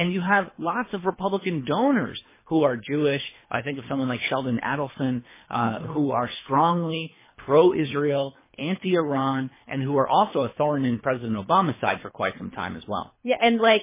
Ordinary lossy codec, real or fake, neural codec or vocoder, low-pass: MP3, 16 kbps; fake; codec, 24 kHz, 3 kbps, HILCodec; 3.6 kHz